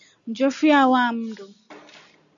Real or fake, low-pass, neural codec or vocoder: real; 7.2 kHz; none